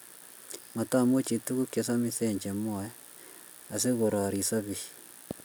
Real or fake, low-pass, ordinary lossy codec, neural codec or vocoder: real; none; none; none